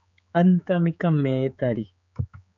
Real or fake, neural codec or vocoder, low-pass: fake; codec, 16 kHz, 4 kbps, X-Codec, HuBERT features, trained on general audio; 7.2 kHz